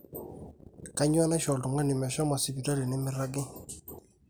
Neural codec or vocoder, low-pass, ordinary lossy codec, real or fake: none; none; none; real